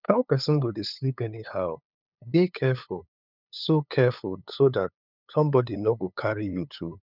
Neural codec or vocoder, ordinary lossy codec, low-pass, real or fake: codec, 16 kHz, 8 kbps, FunCodec, trained on LibriTTS, 25 frames a second; none; 5.4 kHz; fake